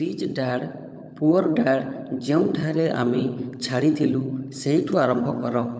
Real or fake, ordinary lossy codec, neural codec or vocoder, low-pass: fake; none; codec, 16 kHz, 16 kbps, FunCodec, trained on LibriTTS, 50 frames a second; none